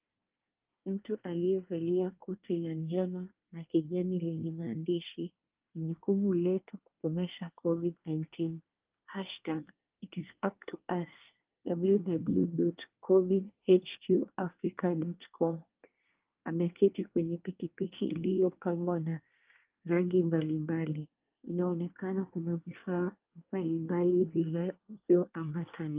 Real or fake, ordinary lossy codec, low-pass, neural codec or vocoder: fake; Opus, 32 kbps; 3.6 kHz; codec, 24 kHz, 1 kbps, SNAC